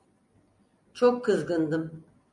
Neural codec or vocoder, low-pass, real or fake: none; 10.8 kHz; real